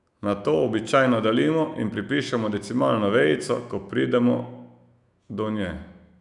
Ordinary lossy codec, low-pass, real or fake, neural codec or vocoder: none; 10.8 kHz; fake; autoencoder, 48 kHz, 128 numbers a frame, DAC-VAE, trained on Japanese speech